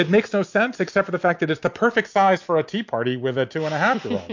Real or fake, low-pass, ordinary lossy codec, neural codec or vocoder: real; 7.2 kHz; MP3, 48 kbps; none